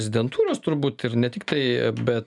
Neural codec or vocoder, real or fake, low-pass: none; real; 10.8 kHz